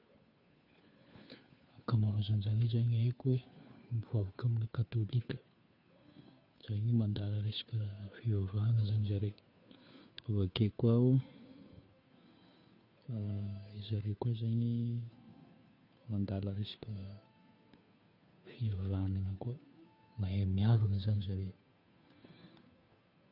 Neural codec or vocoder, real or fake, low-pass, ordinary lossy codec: codec, 16 kHz, 2 kbps, FunCodec, trained on Chinese and English, 25 frames a second; fake; 5.4 kHz; none